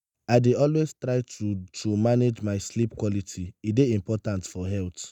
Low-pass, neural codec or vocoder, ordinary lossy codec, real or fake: 19.8 kHz; none; none; real